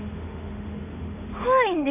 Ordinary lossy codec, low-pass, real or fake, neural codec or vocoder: none; 3.6 kHz; fake; autoencoder, 48 kHz, 32 numbers a frame, DAC-VAE, trained on Japanese speech